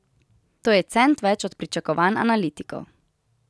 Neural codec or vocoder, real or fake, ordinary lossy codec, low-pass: none; real; none; none